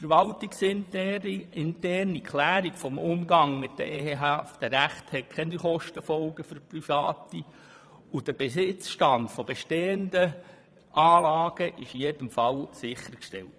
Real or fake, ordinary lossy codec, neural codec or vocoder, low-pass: fake; none; vocoder, 22.05 kHz, 80 mel bands, Vocos; none